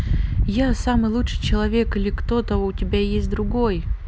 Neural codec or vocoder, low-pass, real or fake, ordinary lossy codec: none; none; real; none